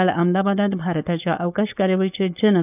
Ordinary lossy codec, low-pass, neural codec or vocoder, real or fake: none; 3.6 kHz; codec, 16 kHz, 4.8 kbps, FACodec; fake